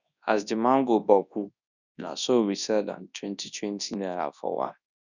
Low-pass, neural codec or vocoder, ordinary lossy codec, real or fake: 7.2 kHz; codec, 24 kHz, 0.9 kbps, WavTokenizer, large speech release; none; fake